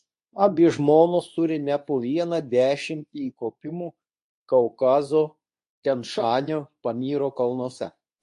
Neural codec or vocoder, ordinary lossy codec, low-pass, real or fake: codec, 24 kHz, 0.9 kbps, WavTokenizer, medium speech release version 2; AAC, 64 kbps; 10.8 kHz; fake